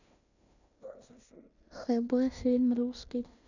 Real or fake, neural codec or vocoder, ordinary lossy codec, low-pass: fake; codec, 16 kHz in and 24 kHz out, 0.9 kbps, LongCat-Audio-Codec, fine tuned four codebook decoder; none; 7.2 kHz